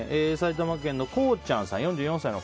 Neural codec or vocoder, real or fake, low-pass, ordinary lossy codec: none; real; none; none